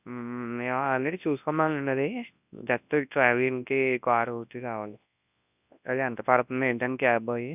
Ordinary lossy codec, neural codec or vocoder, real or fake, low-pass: none; codec, 24 kHz, 0.9 kbps, WavTokenizer, large speech release; fake; 3.6 kHz